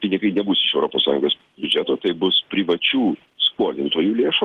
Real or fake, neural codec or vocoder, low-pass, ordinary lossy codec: real; none; 14.4 kHz; Opus, 16 kbps